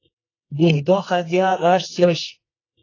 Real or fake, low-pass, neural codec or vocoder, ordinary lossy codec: fake; 7.2 kHz; codec, 24 kHz, 0.9 kbps, WavTokenizer, medium music audio release; AAC, 32 kbps